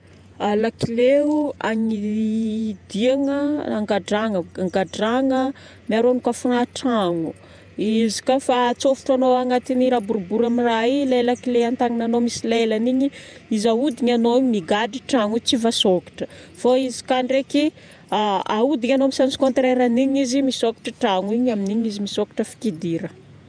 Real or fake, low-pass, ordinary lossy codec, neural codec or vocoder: fake; 9.9 kHz; none; vocoder, 48 kHz, 128 mel bands, Vocos